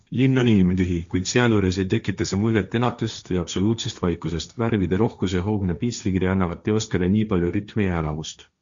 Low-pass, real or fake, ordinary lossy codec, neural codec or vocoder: 7.2 kHz; fake; Opus, 64 kbps; codec, 16 kHz, 1.1 kbps, Voila-Tokenizer